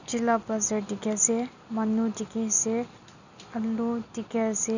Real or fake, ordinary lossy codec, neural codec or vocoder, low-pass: real; none; none; 7.2 kHz